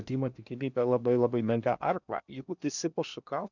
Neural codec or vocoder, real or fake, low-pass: codec, 16 kHz in and 24 kHz out, 0.8 kbps, FocalCodec, streaming, 65536 codes; fake; 7.2 kHz